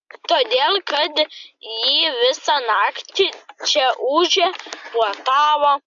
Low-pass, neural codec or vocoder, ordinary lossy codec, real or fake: 7.2 kHz; none; MP3, 64 kbps; real